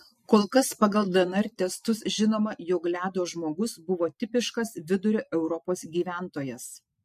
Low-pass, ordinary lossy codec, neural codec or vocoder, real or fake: 14.4 kHz; MP3, 64 kbps; none; real